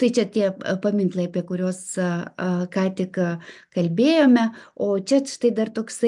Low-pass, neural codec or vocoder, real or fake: 10.8 kHz; none; real